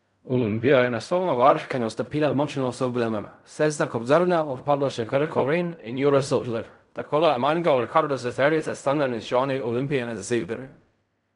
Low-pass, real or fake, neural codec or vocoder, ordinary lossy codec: 10.8 kHz; fake; codec, 16 kHz in and 24 kHz out, 0.4 kbps, LongCat-Audio-Codec, fine tuned four codebook decoder; none